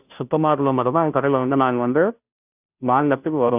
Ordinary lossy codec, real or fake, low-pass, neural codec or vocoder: none; fake; 3.6 kHz; codec, 16 kHz, 0.5 kbps, FunCodec, trained on Chinese and English, 25 frames a second